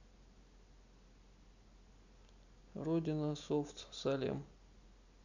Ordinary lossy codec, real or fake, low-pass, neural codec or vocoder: none; real; 7.2 kHz; none